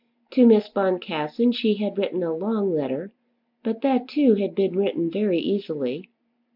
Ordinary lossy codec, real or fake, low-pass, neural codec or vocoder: MP3, 48 kbps; real; 5.4 kHz; none